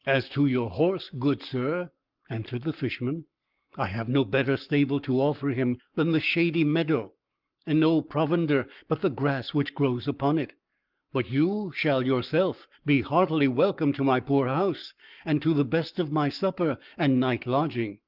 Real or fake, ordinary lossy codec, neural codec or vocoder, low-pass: fake; Opus, 24 kbps; codec, 44.1 kHz, 7.8 kbps, Pupu-Codec; 5.4 kHz